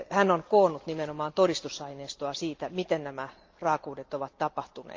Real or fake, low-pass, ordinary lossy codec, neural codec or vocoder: real; 7.2 kHz; Opus, 24 kbps; none